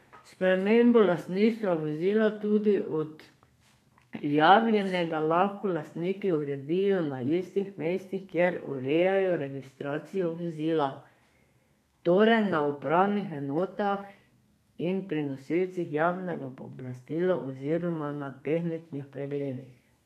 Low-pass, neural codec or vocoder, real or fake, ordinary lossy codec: 14.4 kHz; codec, 32 kHz, 1.9 kbps, SNAC; fake; none